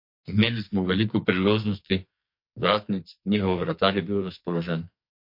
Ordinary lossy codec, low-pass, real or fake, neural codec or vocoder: MP3, 32 kbps; 5.4 kHz; fake; codec, 44.1 kHz, 2.6 kbps, SNAC